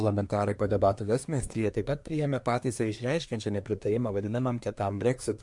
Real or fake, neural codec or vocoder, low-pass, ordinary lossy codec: fake; codec, 24 kHz, 1 kbps, SNAC; 10.8 kHz; MP3, 64 kbps